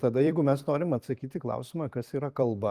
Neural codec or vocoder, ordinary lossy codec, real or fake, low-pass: vocoder, 44.1 kHz, 128 mel bands every 256 samples, BigVGAN v2; Opus, 24 kbps; fake; 14.4 kHz